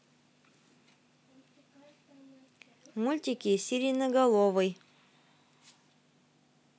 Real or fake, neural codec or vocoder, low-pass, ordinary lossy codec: real; none; none; none